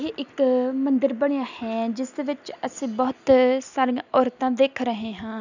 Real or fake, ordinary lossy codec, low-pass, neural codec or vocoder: real; none; 7.2 kHz; none